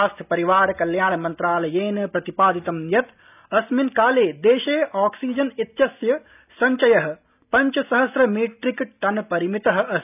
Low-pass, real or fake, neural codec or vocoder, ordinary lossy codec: 3.6 kHz; real; none; none